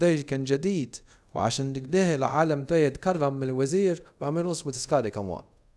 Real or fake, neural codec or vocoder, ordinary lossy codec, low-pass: fake; codec, 24 kHz, 0.5 kbps, DualCodec; none; none